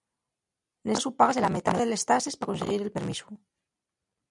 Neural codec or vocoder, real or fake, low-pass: vocoder, 44.1 kHz, 128 mel bands every 256 samples, BigVGAN v2; fake; 10.8 kHz